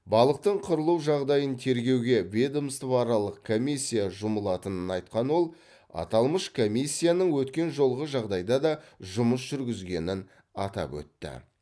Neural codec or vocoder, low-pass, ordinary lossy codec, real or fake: none; none; none; real